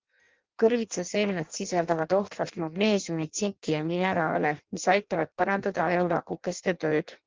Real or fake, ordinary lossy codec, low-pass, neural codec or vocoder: fake; Opus, 32 kbps; 7.2 kHz; codec, 16 kHz in and 24 kHz out, 0.6 kbps, FireRedTTS-2 codec